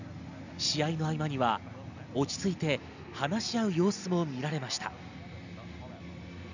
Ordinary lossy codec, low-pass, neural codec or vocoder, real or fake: none; 7.2 kHz; none; real